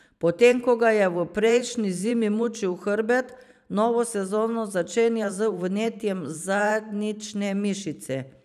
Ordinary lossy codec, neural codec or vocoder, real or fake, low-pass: none; vocoder, 44.1 kHz, 128 mel bands every 512 samples, BigVGAN v2; fake; 14.4 kHz